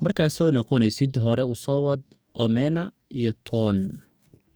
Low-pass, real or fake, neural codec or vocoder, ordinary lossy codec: none; fake; codec, 44.1 kHz, 2.6 kbps, DAC; none